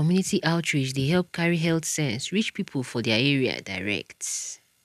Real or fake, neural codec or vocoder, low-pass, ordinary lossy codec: real; none; 14.4 kHz; none